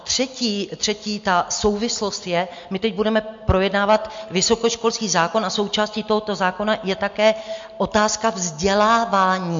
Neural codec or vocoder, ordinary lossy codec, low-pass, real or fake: none; MP3, 48 kbps; 7.2 kHz; real